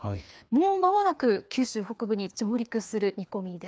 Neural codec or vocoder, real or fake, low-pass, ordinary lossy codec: codec, 16 kHz, 2 kbps, FreqCodec, larger model; fake; none; none